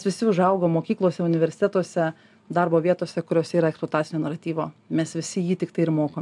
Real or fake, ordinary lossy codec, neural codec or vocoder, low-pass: real; MP3, 96 kbps; none; 10.8 kHz